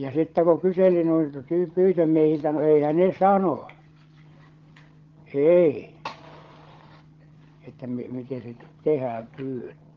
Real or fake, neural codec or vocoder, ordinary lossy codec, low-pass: fake; codec, 16 kHz, 16 kbps, FreqCodec, larger model; Opus, 16 kbps; 7.2 kHz